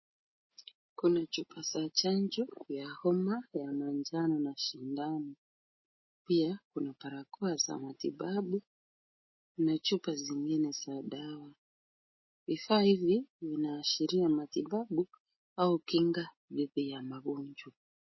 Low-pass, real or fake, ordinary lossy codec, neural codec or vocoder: 7.2 kHz; real; MP3, 24 kbps; none